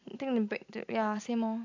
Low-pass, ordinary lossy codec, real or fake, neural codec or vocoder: 7.2 kHz; AAC, 48 kbps; real; none